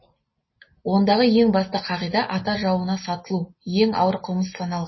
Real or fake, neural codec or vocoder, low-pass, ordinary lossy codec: real; none; 7.2 kHz; MP3, 24 kbps